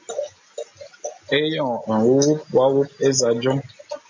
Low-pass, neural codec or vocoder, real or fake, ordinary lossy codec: 7.2 kHz; none; real; MP3, 48 kbps